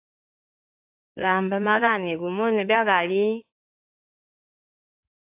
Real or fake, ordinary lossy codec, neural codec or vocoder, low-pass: fake; AAC, 32 kbps; codec, 16 kHz in and 24 kHz out, 2.2 kbps, FireRedTTS-2 codec; 3.6 kHz